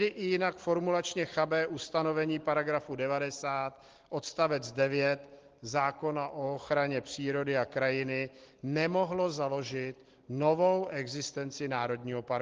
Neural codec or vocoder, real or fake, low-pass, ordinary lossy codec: none; real; 7.2 kHz; Opus, 16 kbps